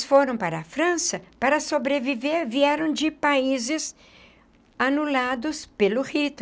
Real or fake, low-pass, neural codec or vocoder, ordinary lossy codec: real; none; none; none